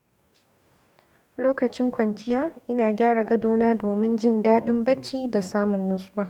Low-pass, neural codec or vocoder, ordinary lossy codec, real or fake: 19.8 kHz; codec, 44.1 kHz, 2.6 kbps, DAC; none; fake